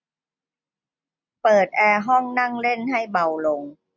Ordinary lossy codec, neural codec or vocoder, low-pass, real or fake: none; none; 7.2 kHz; real